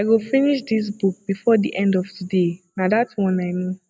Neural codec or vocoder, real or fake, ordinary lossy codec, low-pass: none; real; none; none